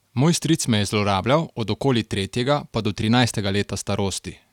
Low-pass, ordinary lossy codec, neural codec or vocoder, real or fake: 19.8 kHz; none; none; real